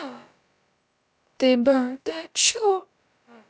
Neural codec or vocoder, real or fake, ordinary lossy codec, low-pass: codec, 16 kHz, about 1 kbps, DyCAST, with the encoder's durations; fake; none; none